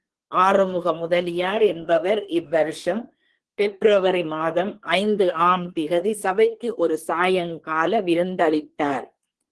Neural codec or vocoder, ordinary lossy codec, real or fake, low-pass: codec, 24 kHz, 1 kbps, SNAC; Opus, 16 kbps; fake; 10.8 kHz